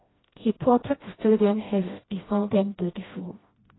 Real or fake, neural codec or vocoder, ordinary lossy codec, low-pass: fake; codec, 16 kHz, 1 kbps, FreqCodec, smaller model; AAC, 16 kbps; 7.2 kHz